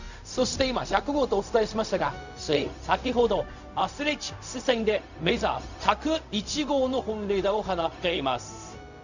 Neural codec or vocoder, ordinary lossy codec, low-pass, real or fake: codec, 16 kHz, 0.4 kbps, LongCat-Audio-Codec; none; 7.2 kHz; fake